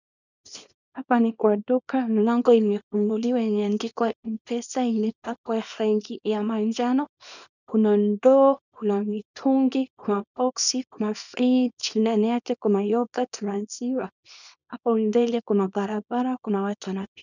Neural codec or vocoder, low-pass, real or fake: codec, 24 kHz, 0.9 kbps, WavTokenizer, small release; 7.2 kHz; fake